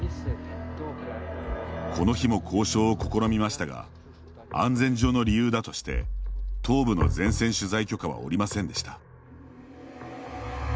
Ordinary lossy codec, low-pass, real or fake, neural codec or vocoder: none; none; real; none